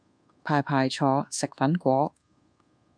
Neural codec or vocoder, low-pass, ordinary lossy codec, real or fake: codec, 24 kHz, 1.2 kbps, DualCodec; 9.9 kHz; AAC, 64 kbps; fake